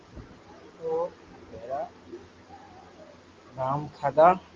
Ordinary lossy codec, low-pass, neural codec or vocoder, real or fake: Opus, 32 kbps; 7.2 kHz; none; real